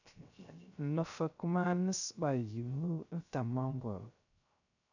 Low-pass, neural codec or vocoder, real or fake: 7.2 kHz; codec, 16 kHz, 0.3 kbps, FocalCodec; fake